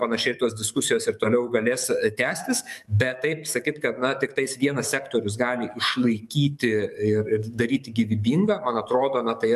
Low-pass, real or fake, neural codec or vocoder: 14.4 kHz; fake; codec, 44.1 kHz, 7.8 kbps, DAC